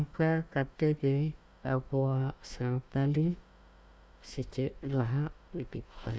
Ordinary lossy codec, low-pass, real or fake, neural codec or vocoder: none; none; fake; codec, 16 kHz, 1 kbps, FunCodec, trained on Chinese and English, 50 frames a second